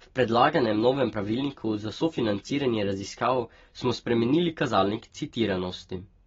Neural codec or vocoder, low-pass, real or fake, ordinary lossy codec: none; 7.2 kHz; real; AAC, 24 kbps